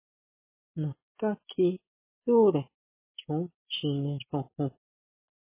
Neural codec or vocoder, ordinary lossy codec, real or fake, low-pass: none; MP3, 16 kbps; real; 3.6 kHz